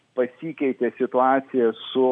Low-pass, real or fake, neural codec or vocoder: 9.9 kHz; real; none